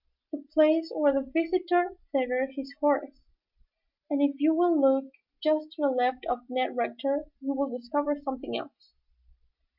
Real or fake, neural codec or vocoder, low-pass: real; none; 5.4 kHz